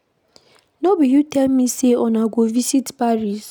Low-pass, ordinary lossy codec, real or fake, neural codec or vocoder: none; none; real; none